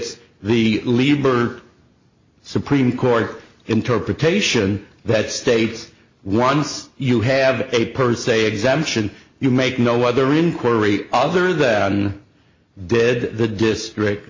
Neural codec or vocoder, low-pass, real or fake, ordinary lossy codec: none; 7.2 kHz; real; MP3, 32 kbps